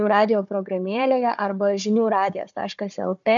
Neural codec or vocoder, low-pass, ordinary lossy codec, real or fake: codec, 16 kHz, 4 kbps, FunCodec, trained on Chinese and English, 50 frames a second; 7.2 kHz; MP3, 96 kbps; fake